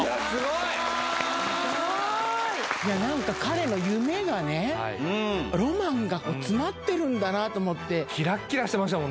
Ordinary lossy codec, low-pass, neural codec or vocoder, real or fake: none; none; none; real